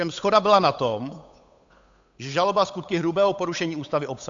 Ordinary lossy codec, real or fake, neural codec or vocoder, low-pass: AAC, 64 kbps; fake; codec, 16 kHz, 8 kbps, FunCodec, trained on Chinese and English, 25 frames a second; 7.2 kHz